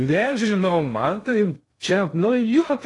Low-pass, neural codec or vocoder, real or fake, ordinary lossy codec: 10.8 kHz; codec, 16 kHz in and 24 kHz out, 0.6 kbps, FocalCodec, streaming, 2048 codes; fake; AAC, 48 kbps